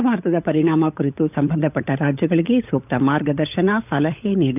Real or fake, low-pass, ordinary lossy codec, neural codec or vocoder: fake; 3.6 kHz; none; codec, 16 kHz, 16 kbps, FunCodec, trained on LibriTTS, 50 frames a second